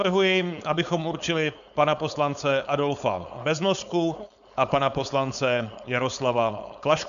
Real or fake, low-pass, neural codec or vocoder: fake; 7.2 kHz; codec, 16 kHz, 4.8 kbps, FACodec